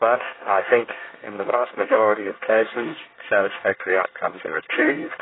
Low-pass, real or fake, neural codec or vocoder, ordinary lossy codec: 7.2 kHz; fake; codec, 24 kHz, 1 kbps, SNAC; AAC, 16 kbps